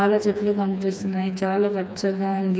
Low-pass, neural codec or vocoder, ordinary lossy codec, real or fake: none; codec, 16 kHz, 2 kbps, FreqCodec, smaller model; none; fake